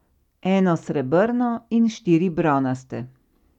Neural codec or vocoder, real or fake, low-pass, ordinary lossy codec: none; real; 19.8 kHz; none